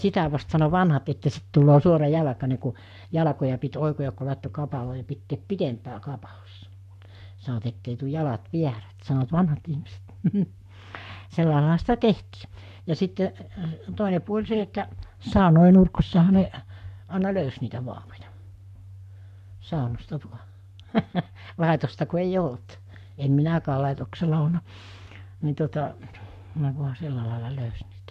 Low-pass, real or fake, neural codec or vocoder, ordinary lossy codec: 14.4 kHz; fake; codec, 44.1 kHz, 7.8 kbps, Pupu-Codec; none